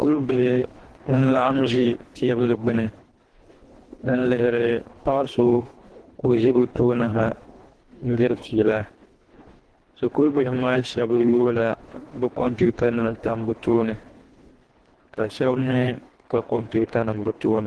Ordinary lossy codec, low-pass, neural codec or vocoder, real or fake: Opus, 16 kbps; 10.8 kHz; codec, 24 kHz, 1.5 kbps, HILCodec; fake